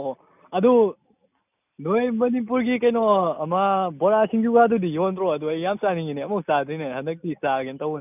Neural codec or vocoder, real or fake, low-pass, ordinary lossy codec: none; real; 3.6 kHz; none